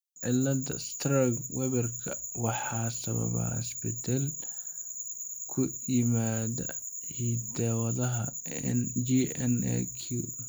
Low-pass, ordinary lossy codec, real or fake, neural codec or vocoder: none; none; real; none